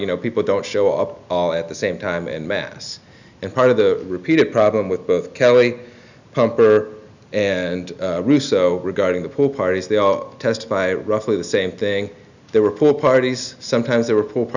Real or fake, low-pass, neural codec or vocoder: real; 7.2 kHz; none